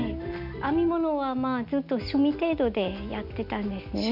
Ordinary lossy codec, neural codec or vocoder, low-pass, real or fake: none; none; 5.4 kHz; real